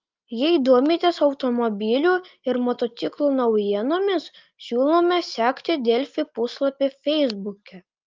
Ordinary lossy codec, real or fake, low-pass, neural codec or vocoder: Opus, 24 kbps; real; 7.2 kHz; none